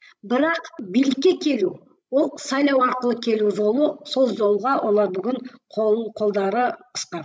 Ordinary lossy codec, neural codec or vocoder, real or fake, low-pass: none; codec, 16 kHz, 16 kbps, FreqCodec, larger model; fake; none